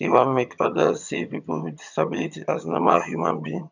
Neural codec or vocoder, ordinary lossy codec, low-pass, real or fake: vocoder, 22.05 kHz, 80 mel bands, HiFi-GAN; none; 7.2 kHz; fake